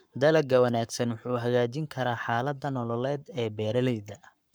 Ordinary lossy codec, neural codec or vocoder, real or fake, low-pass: none; codec, 44.1 kHz, 7.8 kbps, Pupu-Codec; fake; none